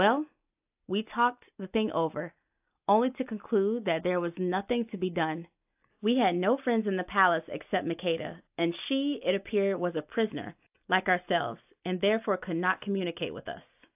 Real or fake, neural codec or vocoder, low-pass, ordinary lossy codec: real; none; 3.6 kHz; AAC, 32 kbps